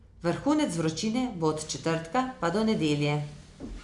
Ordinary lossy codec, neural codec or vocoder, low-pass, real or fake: none; none; 10.8 kHz; real